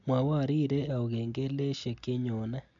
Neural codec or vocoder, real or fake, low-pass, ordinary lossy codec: none; real; 7.2 kHz; MP3, 64 kbps